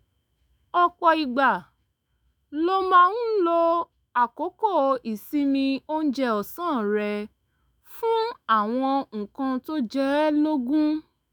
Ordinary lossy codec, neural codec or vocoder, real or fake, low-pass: none; autoencoder, 48 kHz, 128 numbers a frame, DAC-VAE, trained on Japanese speech; fake; none